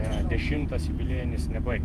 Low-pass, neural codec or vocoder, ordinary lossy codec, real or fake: 14.4 kHz; vocoder, 48 kHz, 128 mel bands, Vocos; Opus, 32 kbps; fake